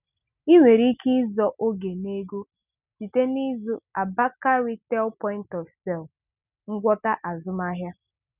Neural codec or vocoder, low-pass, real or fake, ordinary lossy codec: none; 3.6 kHz; real; none